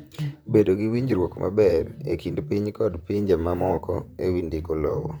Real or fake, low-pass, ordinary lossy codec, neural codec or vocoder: fake; none; none; vocoder, 44.1 kHz, 128 mel bands, Pupu-Vocoder